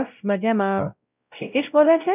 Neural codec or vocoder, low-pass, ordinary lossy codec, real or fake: codec, 16 kHz, 0.5 kbps, X-Codec, WavLM features, trained on Multilingual LibriSpeech; 3.6 kHz; none; fake